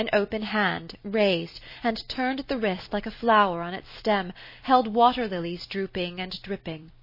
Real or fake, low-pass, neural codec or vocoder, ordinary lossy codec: fake; 5.4 kHz; vocoder, 44.1 kHz, 128 mel bands every 256 samples, BigVGAN v2; MP3, 24 kbps